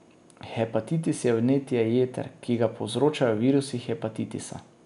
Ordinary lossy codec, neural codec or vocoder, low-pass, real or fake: none; none; 10.8 kHz; real